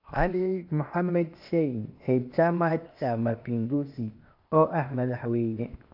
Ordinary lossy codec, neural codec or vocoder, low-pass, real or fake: AAC, 48 kbps; codec, 16 kHz, 0.8 kbps, ZipCodec; 5.4 kHz; fake